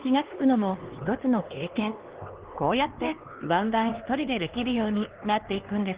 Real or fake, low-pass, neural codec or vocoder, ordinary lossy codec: fake; 3.6 kHz; codec, 16 kHz, 2 kbps, X-Codec, HuBERT features, trained on LibriSpeech; Opus, 16 kbps